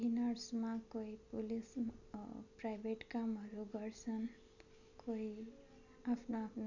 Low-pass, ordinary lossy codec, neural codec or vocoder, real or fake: 7.2 kHz; none; none; real